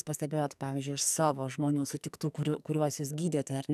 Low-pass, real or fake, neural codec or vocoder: 14.4 kHz; fake; codec, 44.1 kHz, 2.6 kbps, SNAC